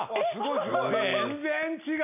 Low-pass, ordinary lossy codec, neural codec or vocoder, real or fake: 3.6 kHz; none; none; real